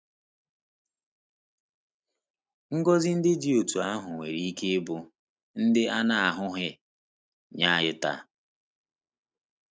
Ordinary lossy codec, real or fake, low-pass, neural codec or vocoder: none; real; none; none